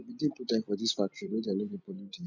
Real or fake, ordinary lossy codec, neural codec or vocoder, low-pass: real; none; none; 7.2 kHz